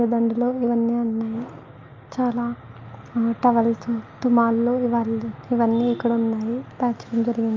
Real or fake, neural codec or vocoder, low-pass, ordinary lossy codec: real; none; 7.2 kHz; Opus, 24 kbps